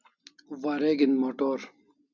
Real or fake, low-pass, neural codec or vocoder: real; 7.2 kHz; none